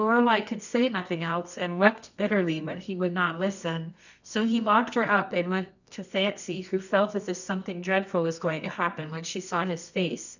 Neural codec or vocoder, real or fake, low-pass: codec, 24 kHz, 0.9 kbps, WavTokenizer, medium music audio release; fake; 7.2 kHz